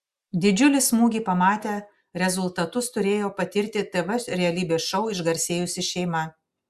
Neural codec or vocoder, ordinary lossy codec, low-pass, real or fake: none; Opus, 64 kbps; 14.4 kHz; real